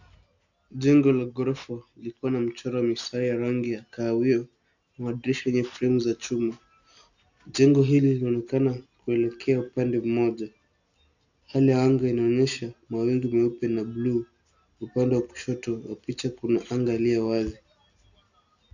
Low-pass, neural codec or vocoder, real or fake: 7.2 kHz; none; real